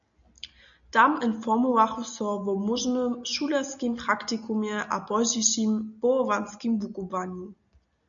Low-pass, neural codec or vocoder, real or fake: 7.2 kHz; none; real